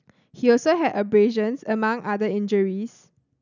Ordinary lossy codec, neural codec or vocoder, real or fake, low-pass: none; none; real; 7.2 kHz